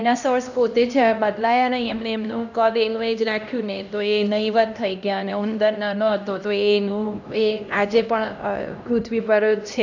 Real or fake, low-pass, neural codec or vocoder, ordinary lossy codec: fake; 7.2 kHz; codec, 16 kHz, 1 kbps, X-Codec, HuBERT features, trained on LibriSpeech; none